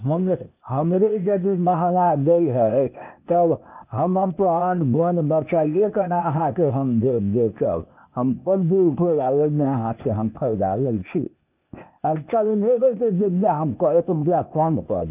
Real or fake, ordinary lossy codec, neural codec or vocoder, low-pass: fake; none; codec, 16 kHz, 0.8 kbps, ZipCodec; 3.6 kHz